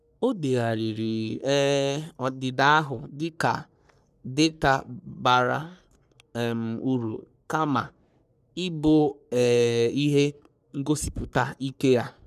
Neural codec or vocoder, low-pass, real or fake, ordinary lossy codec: codec, 44.1 kHz, 3.4 kbps, Pupu-Codec; 14.4 kHz; fake; none